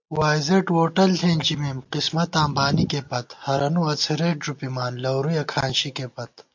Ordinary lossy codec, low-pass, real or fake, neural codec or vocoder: MP3, 48 kbps; 7.2 kHz; real; none